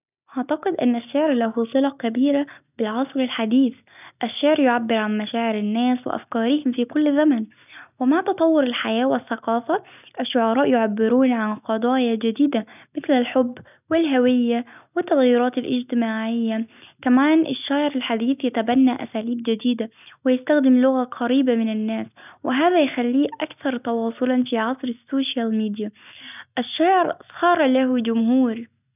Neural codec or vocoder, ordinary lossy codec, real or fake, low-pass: none; none; real; 3.6 kHz